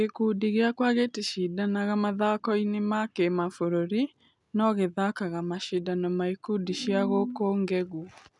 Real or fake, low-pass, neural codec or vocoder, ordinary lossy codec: real; 10.8 kHz; none; none